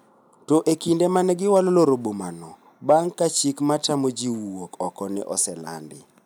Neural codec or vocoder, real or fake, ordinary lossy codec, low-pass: none; real; none; none